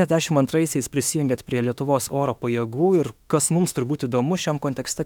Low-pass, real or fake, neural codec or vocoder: 19.8 kHz; fake; autoencoder, 48 kHz, 32 numbers a frame, DAC-VAE, trained on Japanese speech